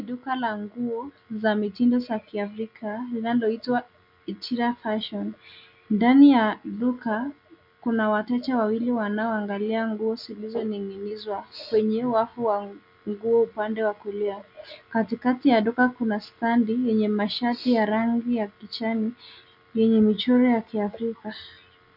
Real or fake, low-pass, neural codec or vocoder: real; 5.4 kHz; none